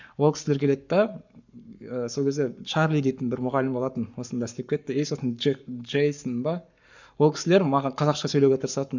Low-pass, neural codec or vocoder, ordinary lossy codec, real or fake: 7.2 kHz; codec, 44.1 kHz, 7.8 kbps, Pupu-Codec; none; fake